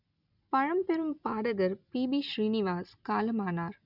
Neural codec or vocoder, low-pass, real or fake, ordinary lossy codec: none; 5.4 kHz; real; none